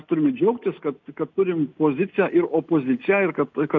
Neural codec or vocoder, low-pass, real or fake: none; 7.2 kHz; real